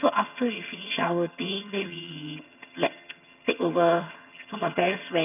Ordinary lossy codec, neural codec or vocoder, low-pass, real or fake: none; vocoder, 22.05 kHz, 80 mel bands, HiFi-GAN; 3.6 kHz; fake